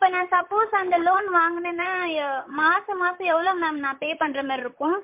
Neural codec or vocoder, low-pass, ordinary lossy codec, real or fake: vocoder, 44.1 kHz, 128 mel bands, Pupu-Vocoder; 3.6 kHz; MP3, 32 kbps; fake